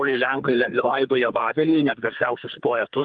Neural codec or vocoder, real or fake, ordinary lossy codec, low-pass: codec, 44.1 kHz, 2.6 kbps, SNAC; fake; Opus, 32 kbps; 9.9 kHz